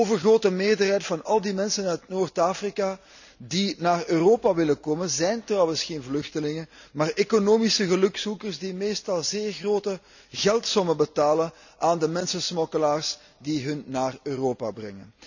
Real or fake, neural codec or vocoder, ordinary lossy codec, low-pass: real; none; none; 7.2 kHz